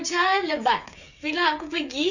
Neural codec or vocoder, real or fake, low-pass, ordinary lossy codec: codec, 16 kHz, 8 kbps, FreqCodec, smaller model; fake; 7.2 kHz; none